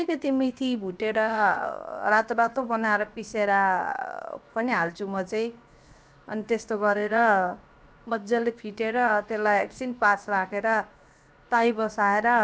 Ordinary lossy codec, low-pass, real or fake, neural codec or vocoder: none; none; fake; codec, 16 kHz, 0.7 kbps, FocalCodec